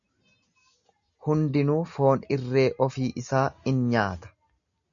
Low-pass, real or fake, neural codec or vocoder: 7.2 kHz; real; none